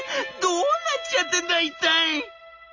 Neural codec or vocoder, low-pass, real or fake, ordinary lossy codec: none; 7.2 kHz; real; none